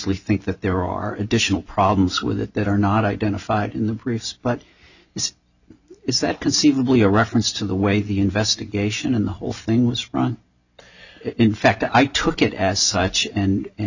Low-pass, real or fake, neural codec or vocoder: 7.2 kHz; real; none